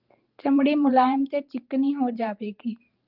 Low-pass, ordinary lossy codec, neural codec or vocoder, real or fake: 5.4 kHz; Opus, 32 kbps; vocoder, 44.1 kHz, 128 mel bands every 512 samples, BigVGAN v2; fake